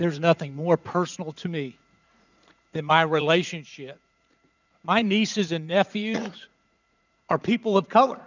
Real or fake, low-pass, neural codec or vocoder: fake; 7.2 kHz; vocoder, 44.1 kHz, 80 mel bands, Vocos